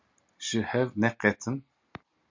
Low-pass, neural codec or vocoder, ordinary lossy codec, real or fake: 7.2 kHz; none; MP3, 64 kbps; real